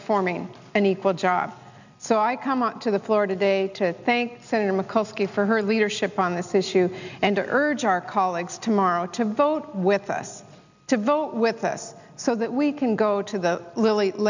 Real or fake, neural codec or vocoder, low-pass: real; none; 7.2 kHz